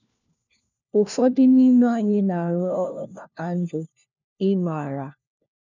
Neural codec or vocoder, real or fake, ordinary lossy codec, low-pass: codec, 16 kHz, 1 kbps, FunCodec, trained on LibriTTS, 50 frames a second; fake; none; 7.2 kHz